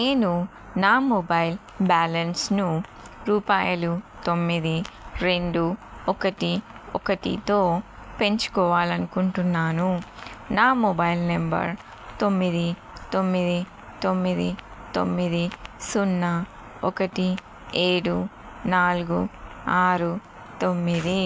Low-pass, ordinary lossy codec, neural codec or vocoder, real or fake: none; none; none; real